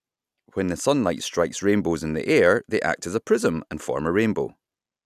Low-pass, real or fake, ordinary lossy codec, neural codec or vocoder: 14.4 kHz; real; none; none